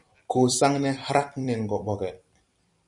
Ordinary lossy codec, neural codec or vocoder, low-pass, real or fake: MP3, 96 kbps; vocoder, 44.1 kHz, 128 mel bands every 256 samples, BigVGAN v2; 10.8 kHz; fake